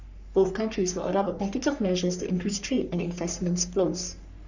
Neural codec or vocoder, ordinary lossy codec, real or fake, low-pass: codec, 44.1 kHz, 3.4 kbps, Pupu-Codec; none; fake; 7.2 kHz